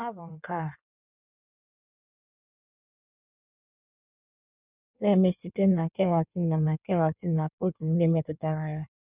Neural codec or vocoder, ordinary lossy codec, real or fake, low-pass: codec, 16 kHz in and 24 kHz out, 1.1 kbps, FireRedTTS-2 codec; none; fake; 3.6 kHz